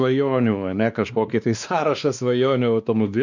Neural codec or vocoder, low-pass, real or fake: codec, 16 kHz, 2 kbps, X-Codec, WavLM features, trained on Multilingual LibriSpeech; 7.2 kHz; fake